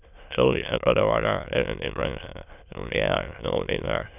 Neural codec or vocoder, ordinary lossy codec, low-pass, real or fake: autoencoder, 22.05 kHz, a latent of 192 numbers a frame, VITS, trained on many speakers; none; 3.6 kHz; fake